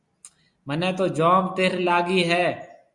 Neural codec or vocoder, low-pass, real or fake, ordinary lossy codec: none; 10.8 kHz; real; Opus, 64 kbps